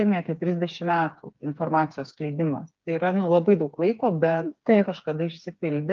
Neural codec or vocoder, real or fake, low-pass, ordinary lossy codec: codec, 16 kHz, 4 kbps, FreqCodec, smaller model; fake; 7.2 kHz; Opus, 24 kbps